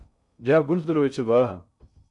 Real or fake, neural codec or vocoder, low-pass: fake; codec, 16 kHz in and 24 kHz out, 0.6 kbps, FocalCodec, streaming, 2048 codes; 10.8 kHz